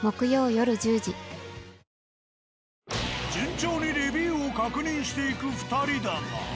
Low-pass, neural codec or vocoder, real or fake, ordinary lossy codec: none; none; real; none